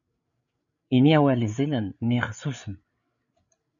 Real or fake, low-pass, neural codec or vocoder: fake; 7.2 kHz; codec, 16 kHz, 8 kbps, FreqCodec, larger model